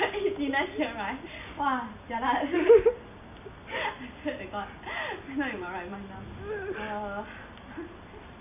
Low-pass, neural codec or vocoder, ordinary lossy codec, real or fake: 3.6 kHz; none; none; real